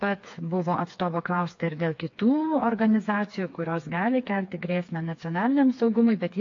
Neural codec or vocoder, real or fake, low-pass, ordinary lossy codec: codec, 16 kHz, 4 kbps, FreqCodec, smaller model; fake; 7.2 kHz; AAC, 48 kbps